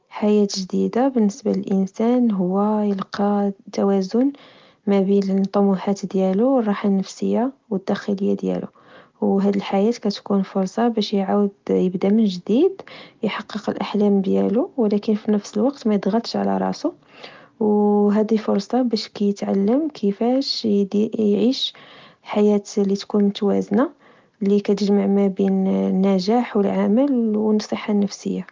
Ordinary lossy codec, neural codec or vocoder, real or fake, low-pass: Opus, 32 kbps; none; real; 7.2 kHz